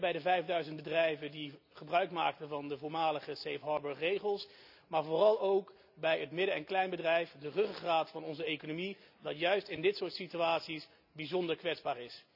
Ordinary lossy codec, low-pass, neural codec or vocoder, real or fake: none; 5.4 kHz; none; real